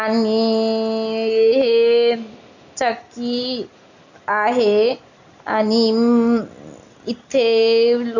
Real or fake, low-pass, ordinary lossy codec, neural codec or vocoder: real; 7.2 kHz; none; none